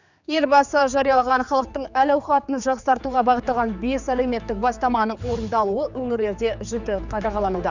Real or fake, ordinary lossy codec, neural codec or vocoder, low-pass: fake; none; codec, 16 kHz, 4 kbps, X-Codec, HuBERT features, trained on general audio; 7.2 kHz